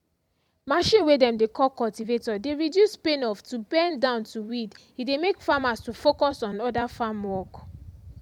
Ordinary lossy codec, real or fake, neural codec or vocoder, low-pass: MP3, 96 kbps; fake; vocoder, 44.1 kHz, 128 mel bands every 256 samples, BigVGAN v2; 19.8 kHz